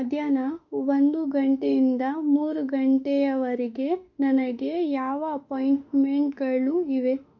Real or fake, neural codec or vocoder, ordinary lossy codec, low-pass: fake; autoencoder, 48 kHz, 32 numbers a frame, DAC-VAE, trained on Japanese speech; none; 7.2 kHz